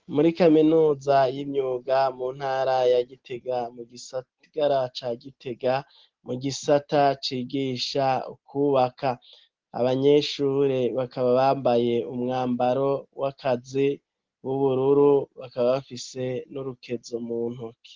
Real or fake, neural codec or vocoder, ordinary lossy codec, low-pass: real; none; Opus, 16 kbps; 7.2 kHz